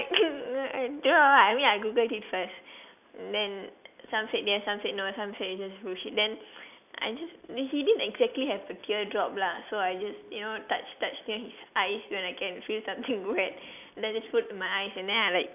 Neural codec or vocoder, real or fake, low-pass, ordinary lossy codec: none; real; 3.6 kHz; none